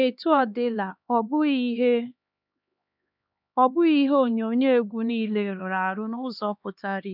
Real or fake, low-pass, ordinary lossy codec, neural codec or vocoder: fake; 5.4 kHz; none; codec, 16 kHz, 2 kbps, X-Codec, HuBERT features, trained on LibriSpeech